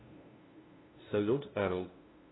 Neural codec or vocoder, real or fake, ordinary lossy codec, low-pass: codec, 16 kHz, 0.5 kbps, FunCodec, trained on LibriTTS, 25 frames a second; fake; AAC, 16 kbps; 7.2 kHz